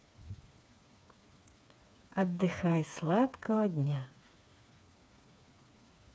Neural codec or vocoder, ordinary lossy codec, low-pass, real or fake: codec, 16 kHz, 4 kbps, FreqCodec, smaller model; none; none; fake